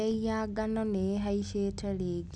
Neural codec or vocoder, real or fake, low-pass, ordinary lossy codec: none; real; none; none